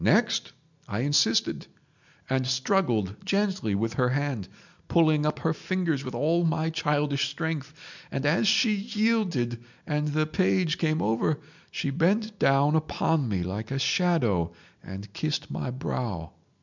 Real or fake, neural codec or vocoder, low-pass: real; none; 7.2 kHz